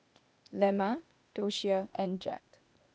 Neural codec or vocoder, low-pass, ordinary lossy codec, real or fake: codec, 16 kHz, 0.8 kbps, ZipCodec; none; none; fake